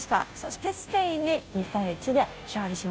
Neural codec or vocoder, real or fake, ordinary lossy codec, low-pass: codec, 16 kHz, 0.5 kbps, FunCodec, trained on Chinese and English, 25 frames a second; fake; none; none